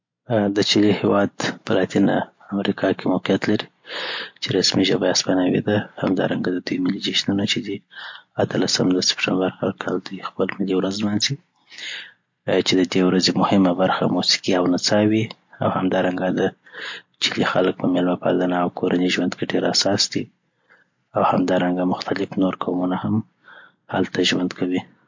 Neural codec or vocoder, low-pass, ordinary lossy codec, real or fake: none; 7.2 kHz; MP3, 48 kbps; real